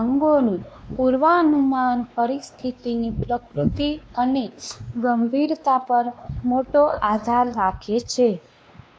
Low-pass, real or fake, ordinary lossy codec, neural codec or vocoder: none; fake; none; codec, 16 kHz, 2 kbps, X-Codec, WavLM features, trained on Multilingual LibriSpeech